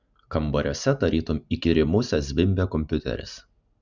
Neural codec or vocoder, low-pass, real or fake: none; 7.2 kHz; real